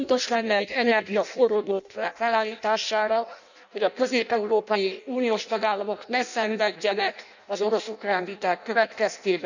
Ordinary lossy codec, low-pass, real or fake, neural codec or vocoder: none; 7.2 kHz; fake; codec, 16 kHz in and 24 kHz out, 0.6 kbps, FireRedTTS-2 codec